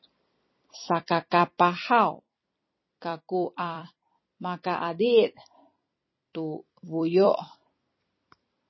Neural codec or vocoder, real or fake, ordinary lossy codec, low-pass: vocoder, 44.1 kHz, 128 mel bands every 256 samples, BigVGAN v2; fake; MP3, 24 kbps; 7.2 kHz